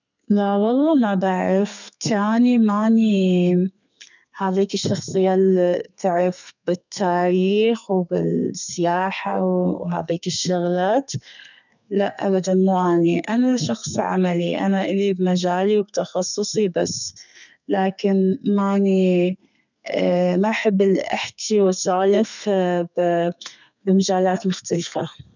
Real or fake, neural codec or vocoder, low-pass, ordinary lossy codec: fake; codec, 32 kHz, 1.9 kbps, SNAC; 7.2 kHz; none